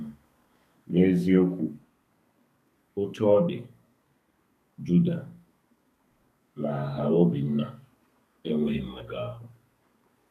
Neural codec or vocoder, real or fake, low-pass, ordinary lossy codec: codec, 32 kHz, 1.9 kbps, SNAC; fake; 14.4 kHz; none